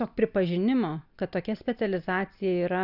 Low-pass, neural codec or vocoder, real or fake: 5.4 kHz; none; real